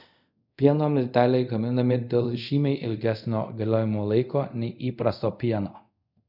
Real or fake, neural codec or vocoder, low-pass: fake; codec, 24 kHz, 0.5 kbps, DualCodec; 5.4 kHz